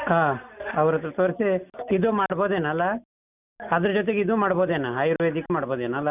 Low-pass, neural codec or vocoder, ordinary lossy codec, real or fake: 3.6 kHz; none; none; real